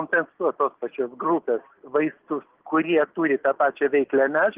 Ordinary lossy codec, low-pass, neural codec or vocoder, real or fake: Opus, 16 kbps; 3.6 kHz; none; real